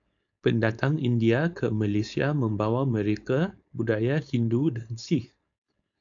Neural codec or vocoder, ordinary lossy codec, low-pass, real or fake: codec, 16 kHz, 4.8 kbps, FACodec; AAC, 64 kbps; 7.2 kHz; fake